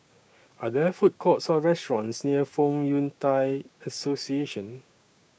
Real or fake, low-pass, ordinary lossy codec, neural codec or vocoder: fake; none; none; codec, 16 kHz, 6 kbps, DAC